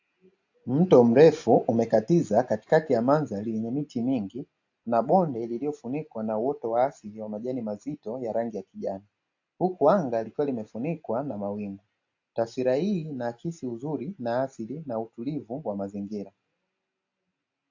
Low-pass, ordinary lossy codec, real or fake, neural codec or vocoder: 7.2 kHz; AAC, 48 kbps; real; none